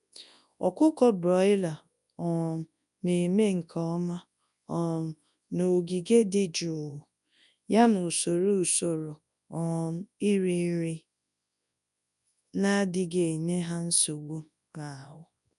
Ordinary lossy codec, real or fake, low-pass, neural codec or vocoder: none; fake; 10.8 kHz; codec, 24 kHz, 0.9 kbps, WavTokenizer, large speech release